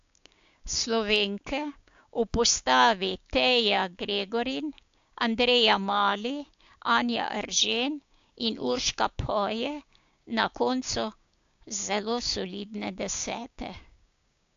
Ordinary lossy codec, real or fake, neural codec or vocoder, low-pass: AAC, 64 kbps; fake; codec, 16 kHz, 6 kbps, DAC; 7.2 kHz